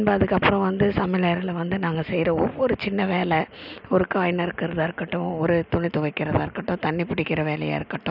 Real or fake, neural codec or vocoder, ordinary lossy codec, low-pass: real; none; none; 5.4 kHz